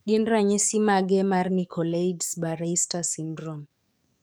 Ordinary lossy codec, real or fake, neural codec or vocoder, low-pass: none; fake; codec, 44.1 kHz, 7.8 kbps, DAC; none